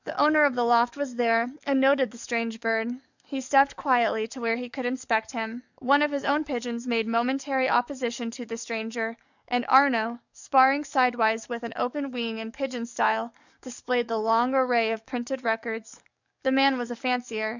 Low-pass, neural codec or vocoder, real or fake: 7.2 kHz; codec, 44.1 kHz, 7.8 kbps, DAC; fake